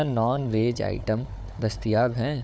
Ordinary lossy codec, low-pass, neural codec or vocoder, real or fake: none; none; codec, 16 kHz, 4 kbps, FunCodec, trained on Chinese and English, 50 frames a second; fake